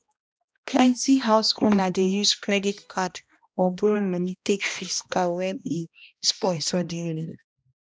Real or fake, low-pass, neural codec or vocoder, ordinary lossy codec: fake; none; codec, 16 kHz, 1 kbps, X-Codec, HuBERT features, trained on balanced general audio; none